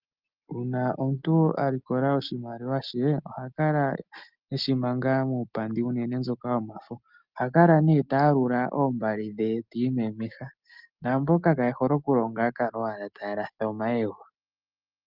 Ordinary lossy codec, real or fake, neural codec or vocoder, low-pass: Opus, 24 kbps; real; none; 5.4 kHz